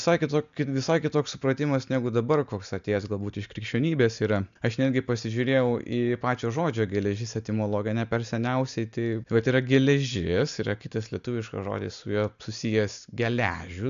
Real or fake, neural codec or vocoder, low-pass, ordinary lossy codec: real; none; 7.2 kHz; AAC, 96 kbps